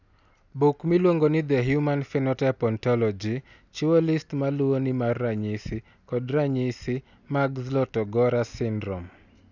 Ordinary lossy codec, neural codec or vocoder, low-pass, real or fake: none; none; 7.2 kHz; real